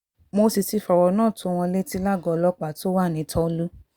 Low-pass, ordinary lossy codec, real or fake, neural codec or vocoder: 19.8 kHz; Opus, 64 kbps; real; none